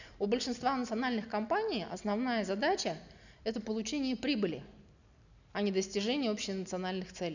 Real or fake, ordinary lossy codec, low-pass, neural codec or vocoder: real; none; 7.2 kHz; none